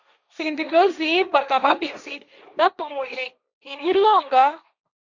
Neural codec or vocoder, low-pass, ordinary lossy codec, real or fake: codec, 16 kHz, 1.1 kbps, Voila-Tokenizer; 7.2 kHz; Opus, 64 kbps; fake